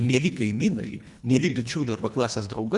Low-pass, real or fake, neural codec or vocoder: 10.8 kHz; fake; codec, 24 kHz, 1.5 kbps, HILCodec